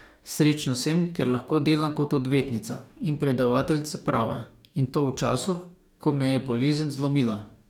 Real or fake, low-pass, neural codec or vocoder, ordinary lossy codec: fake; 19.8 kHz; codec, 44.1 kHz, 2.6 kbps, DAC; none